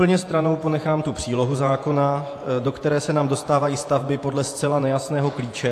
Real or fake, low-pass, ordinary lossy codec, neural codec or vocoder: fake; 14.4 kHz; AAC, 64 kbps; vocoder, 48 kHz, 128 mel bands, Vocos